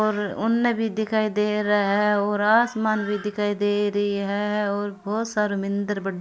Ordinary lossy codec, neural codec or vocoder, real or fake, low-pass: none; none; real; none